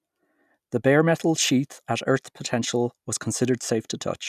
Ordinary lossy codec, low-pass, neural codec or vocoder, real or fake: none; 14.4 kHz; none; real